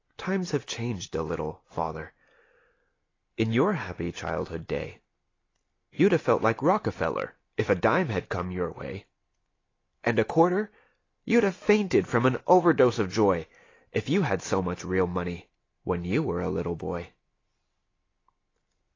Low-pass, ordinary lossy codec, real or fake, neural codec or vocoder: 7.2 kHz; AAC, 32 kbps; real; none